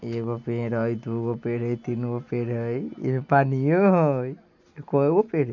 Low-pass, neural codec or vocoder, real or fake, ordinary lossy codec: 7.2 kHz; none; real; none